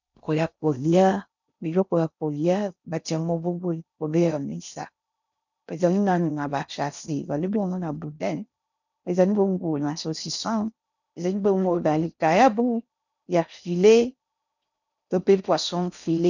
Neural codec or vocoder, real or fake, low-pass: codec, 16 kHz in and 24 kHz out, 0.6 kbps, FocalCodec, streaming, 4096 codes; fake; 7.2 kHz